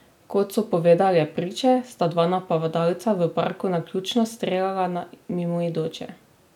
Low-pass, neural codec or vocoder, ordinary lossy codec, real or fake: 19.8 kHz; none; none; real